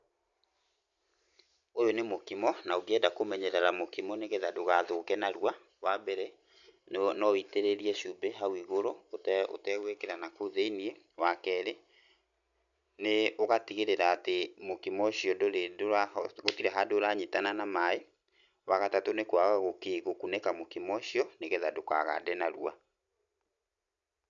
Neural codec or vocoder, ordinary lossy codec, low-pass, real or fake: none; none; 7.2 kHz; real